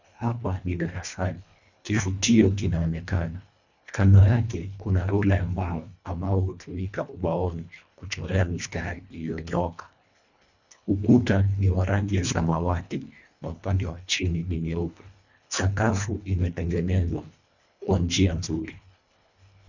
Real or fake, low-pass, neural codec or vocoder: fake; 7.2 kHz; codec, 24 kHz, 1.5 kbps, HILCodec